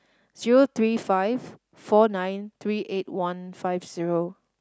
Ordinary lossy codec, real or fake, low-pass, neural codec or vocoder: none; real; none; none